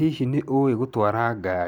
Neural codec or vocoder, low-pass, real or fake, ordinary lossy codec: none; 19.8 kHz; real; none